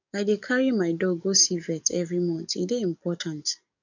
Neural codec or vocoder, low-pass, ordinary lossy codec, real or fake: codec, 44.1 kHz, 7.8 kbps, DAC; 7.2 kHz; none; fake